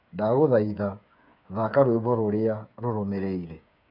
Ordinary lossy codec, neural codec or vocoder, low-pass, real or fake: none; codec, 16 kHz, 8 kbps, FreqCodec, smaller model; 5.4 kHz; fake